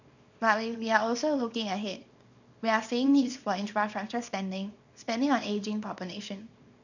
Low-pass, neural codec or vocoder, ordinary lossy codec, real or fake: 7.2 kHz; codec, 24 kHz, 0.9 kbps, WavTokenizer, small release; none; fake